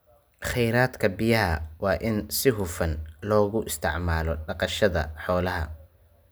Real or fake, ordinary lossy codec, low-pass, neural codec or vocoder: real; none; none; none